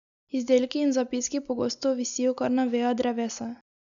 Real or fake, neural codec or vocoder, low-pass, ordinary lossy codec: real; none; 7.2 kHz; none